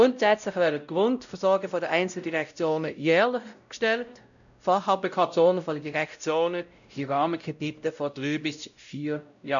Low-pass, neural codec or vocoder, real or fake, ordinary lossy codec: 7.2 kHz; codec, 16 kHz, 0.5 kbps, X-Codec, WavLM features, trained on Multilingual LibriSpeech; fake; none